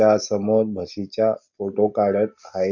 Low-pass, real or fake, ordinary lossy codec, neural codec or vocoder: 7.2 kHz; real; none; none